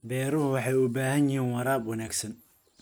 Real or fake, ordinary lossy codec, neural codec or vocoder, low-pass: real; none; none; none